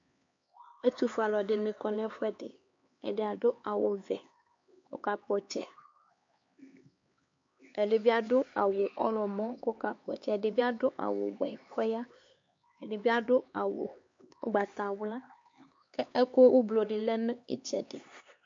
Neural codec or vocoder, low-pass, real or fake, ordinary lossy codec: codec, 16 kHz, 4 kbps, X-Codec, HuBERT features, trained on LibriSpeech; 7.2 kHz; fake; MP3, 48 kbps